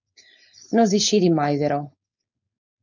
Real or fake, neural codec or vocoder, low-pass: fake; codec, 16 kHz, 4.8 kbps, FACodec; 7.2 kHz